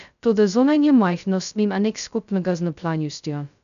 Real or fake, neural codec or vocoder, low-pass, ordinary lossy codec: fake; codec, 16 kHz, 0.2 kbps, FocalCodec; 7.2 kHz; none